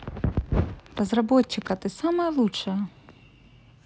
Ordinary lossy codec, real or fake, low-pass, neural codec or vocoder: none; real; none; none